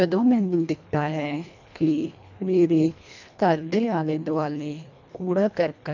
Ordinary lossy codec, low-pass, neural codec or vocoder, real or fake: none; 7.2 kHz; codec, 24 kHz, 1.5 kbps, HILCodec; fake